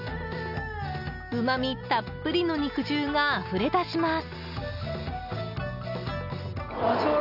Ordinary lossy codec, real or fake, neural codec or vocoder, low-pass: none; real; none; 5.4 kHz